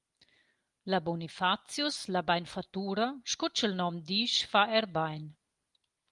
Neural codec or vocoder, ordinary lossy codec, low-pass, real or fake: none; Opus, 32 kbps; 10.8 kHz; real